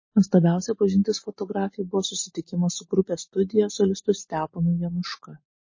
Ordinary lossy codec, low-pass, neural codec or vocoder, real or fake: MP3, 32 kbps; 7.2 kHz; none; real